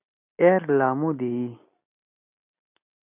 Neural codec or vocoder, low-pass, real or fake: none; 3.6 kHz; real